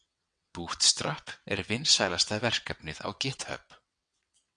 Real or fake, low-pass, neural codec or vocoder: fake; 9.9 kHz; vocoder, 22.05 kHz, 80 mel bands, WaveNeXt